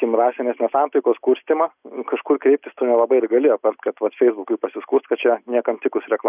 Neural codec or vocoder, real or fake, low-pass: none; real; 3.6 kHz